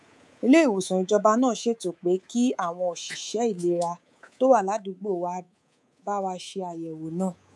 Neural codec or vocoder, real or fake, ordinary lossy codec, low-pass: codec, 24 kHz, 3.1 kbps, DualCodec; fake; none; none